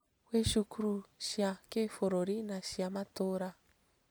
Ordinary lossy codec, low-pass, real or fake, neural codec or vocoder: none; none; real; none